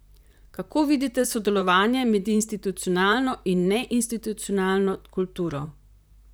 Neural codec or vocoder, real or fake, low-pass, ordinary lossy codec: vocoder, 44.1 kHz, 128 mel bands, Pupu-Vocoder; fake; none; none